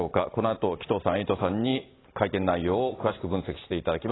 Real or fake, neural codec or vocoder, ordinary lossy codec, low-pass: real; none; AAC, 16 kbps; 7.2 kHz